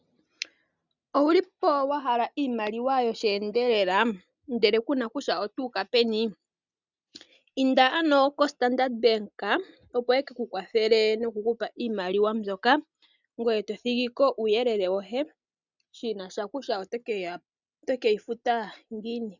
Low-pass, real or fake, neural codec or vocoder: 7.2 kHz; real; none